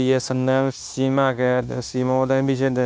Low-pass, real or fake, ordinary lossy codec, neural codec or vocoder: none; fake; none; codec, 16 kHz, 0.9 kbps, LongCat-Audio-Codec